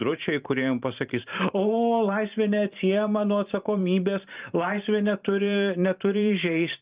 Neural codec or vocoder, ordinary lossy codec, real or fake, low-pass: vocoder, 44.1 kHz, 128 mel bands every 512 samples, BigVGAN v2; Opus, 32 kbps; fake; 3.6 kHz